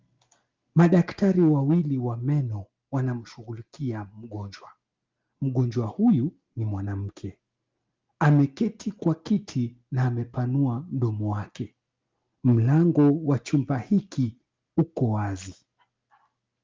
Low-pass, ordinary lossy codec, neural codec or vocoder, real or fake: 7.2 kHz; Opus, 32 kbps; none; real